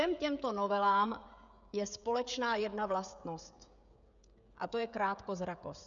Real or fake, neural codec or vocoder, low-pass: fake; codec, 16 kHz, 16 kbps, FreqCodec, smaller model; 7.2 kHz